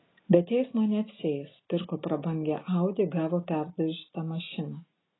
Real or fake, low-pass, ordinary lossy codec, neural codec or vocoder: real; 7.2 kHz; AAC, 16 kbps; none